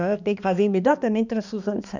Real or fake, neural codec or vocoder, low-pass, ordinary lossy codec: fake; codec, 16 kHz, 2 kbps, X-Codec, HuBERT features, trained on balanced general audio; 7.2 kHz; none